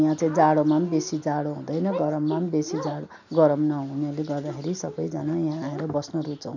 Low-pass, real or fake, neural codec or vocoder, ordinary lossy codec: 7.2 kHz; real; none; none